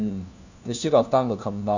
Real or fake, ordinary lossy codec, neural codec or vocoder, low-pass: fake; none; codec, 16 kHz, 1 kbps, FunCodec, trained on LibriTTS, 50 frames a second; 7.2 kHz